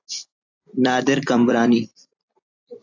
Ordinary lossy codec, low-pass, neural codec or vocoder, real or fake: AAC, 48 kbps; 7.2 kHz; vocoder, 44.1 kHz, 128 mel bands every 256 samples, BigVGAN v2; fake